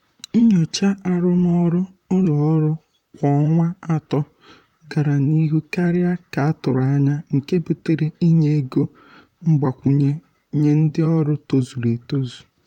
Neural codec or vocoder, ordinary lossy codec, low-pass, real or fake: vocoder, 44.1 kHz, 128 mel bands, Pupu-Vocoder; none; 19.8 kHz; fake